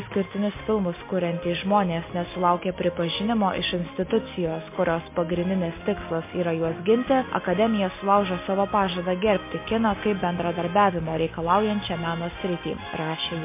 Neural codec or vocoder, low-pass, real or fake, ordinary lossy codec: none; 3.6 kHz; real; MP3, 24 kbps